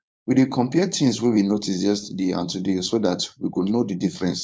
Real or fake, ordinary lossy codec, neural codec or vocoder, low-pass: fake; none; codec, 16 kHz, 4.8 kbps, FACodec; none